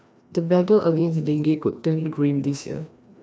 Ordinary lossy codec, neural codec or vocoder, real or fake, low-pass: none; codec, 16 kHz, 1 kbps, FreqCodec, larger model; fake; none